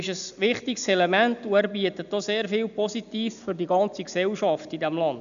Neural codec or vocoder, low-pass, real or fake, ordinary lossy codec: none; 7.2 kHz; real; none